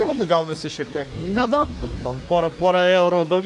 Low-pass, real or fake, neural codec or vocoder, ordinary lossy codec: 10.8 kHz; fake; codec, 24 kHz, 1 kbps, SNAC; AAC, 64 kbps